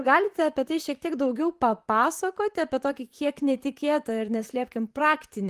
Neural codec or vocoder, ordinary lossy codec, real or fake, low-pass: vocoder, 44.1 kHz, 128 mel bands, Pupu-Vocoder; Opus, 24 kbps; fake; 14.4 kHz